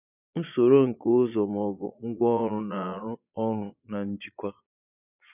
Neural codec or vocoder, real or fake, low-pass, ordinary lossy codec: vocoder, 22.05 kHz, 80 mel bands, Vocos; fake; 3.6 kHz; none